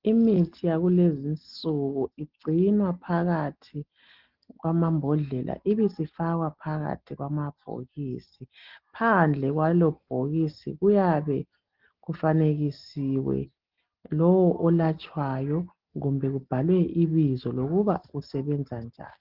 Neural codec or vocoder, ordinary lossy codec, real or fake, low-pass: none; Opus, 16 kbps; real; 5.4 kHz